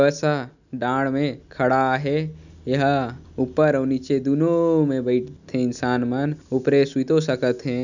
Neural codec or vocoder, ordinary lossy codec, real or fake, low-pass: none; none; real; 7.2 kHz